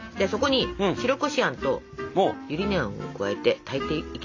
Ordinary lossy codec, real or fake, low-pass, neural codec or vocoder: AAC, 48 kbps; real; 7.2 kHz; none